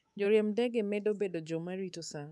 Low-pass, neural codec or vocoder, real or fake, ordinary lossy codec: none; codec, 24 kHz, 3.1 kbps, DualCodec; fake; none